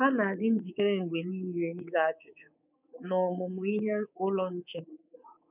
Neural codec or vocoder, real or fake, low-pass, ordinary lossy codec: codec, 24 kHz, 3.1 kbps, DualCodec; fake; 3.6 kHz; none